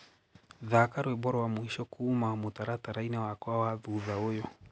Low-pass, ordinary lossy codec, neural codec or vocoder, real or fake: none; none; none; real